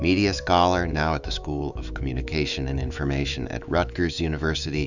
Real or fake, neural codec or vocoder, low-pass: fake; autoencoder, 48 kHz, 128 numbers a frame, DAC-VAE, trained on Japanese speech; 7.2 kHz